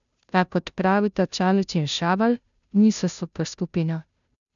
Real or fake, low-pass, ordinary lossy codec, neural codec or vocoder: fake; 7.2 kHz; none; codec, 16 kHz, 0.5 kbps, FunCodec, trained on Chinese and English, 25 frames a second